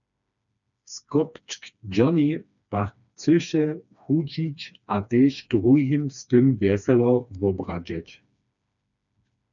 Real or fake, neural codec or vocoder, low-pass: fake; codec, 16 kHz, 2 kbps, FreqCodec, smaller model; 7.2 kHz